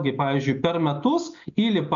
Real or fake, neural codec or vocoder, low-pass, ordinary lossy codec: real; none; 7.2 kHz; MP3, 64 kbps